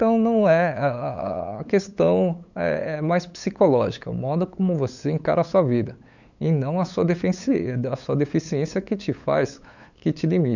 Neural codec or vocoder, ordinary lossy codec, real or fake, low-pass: codec, 16 kHz, 8 kbps, FunCodec, trained on LibriTTS, 25 frames a second; none; fake; 7.2 kHz